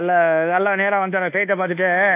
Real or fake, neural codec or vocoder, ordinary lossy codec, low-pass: fake; codec, 24 kHz, 1.2 kbps, DualCodec; AAC, 24 kbps; 3.6 kHz